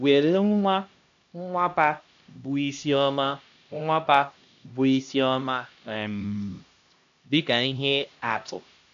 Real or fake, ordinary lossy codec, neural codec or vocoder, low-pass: fake; AAC, 64 kbps; codec, 16 kHz, 1 kbps, X-Codec, HuBERT features, trained on LibriSpeech; 7.2 kHz